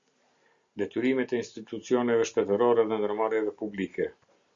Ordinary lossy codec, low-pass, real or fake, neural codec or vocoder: Opus, 64 kbps; 7.2 kHz; real; none